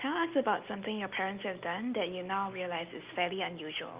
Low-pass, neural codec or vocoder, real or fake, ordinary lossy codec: 3.6 kHz; none; real; Opus, 24 kbps